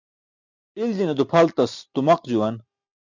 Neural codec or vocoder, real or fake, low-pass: none; real; 7.2 kHz